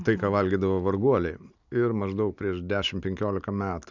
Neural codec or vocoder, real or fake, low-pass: none; real; 7.2 kHz